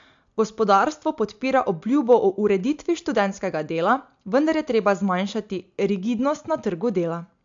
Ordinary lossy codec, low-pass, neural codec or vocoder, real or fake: AAC, 64 kbps; 7.2 kHz; none; real